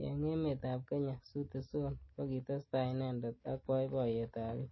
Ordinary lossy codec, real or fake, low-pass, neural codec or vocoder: MP3, 24 kbps; real; 7.2 kHz; none